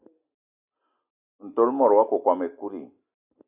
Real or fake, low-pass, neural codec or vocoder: real; 3.6 kHz; none